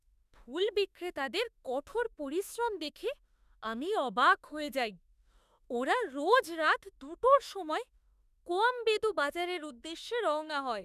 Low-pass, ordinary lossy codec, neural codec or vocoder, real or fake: 14.4 kHz; none; autoencoder, 48 kHz, 32 numbers a frame, DAC-VAE, trained on Japanese speech; fake